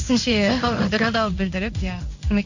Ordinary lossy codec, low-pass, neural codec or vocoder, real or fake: none; 7.2 kHz; codec, 16 kHz in and 24 kHz out, 1 kbps, XY-Tokenizer; fake